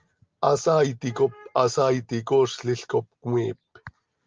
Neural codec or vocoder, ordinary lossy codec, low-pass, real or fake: none; Opus, 24 kbps; 7.2 kHz; real